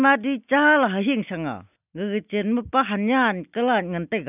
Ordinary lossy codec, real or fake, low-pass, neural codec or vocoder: none; real; 3.6 kHz; none